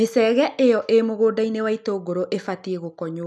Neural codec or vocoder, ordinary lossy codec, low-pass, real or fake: none; none; none; real